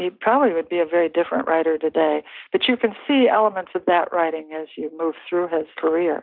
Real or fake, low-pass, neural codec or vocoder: real; 5.4 kHz; none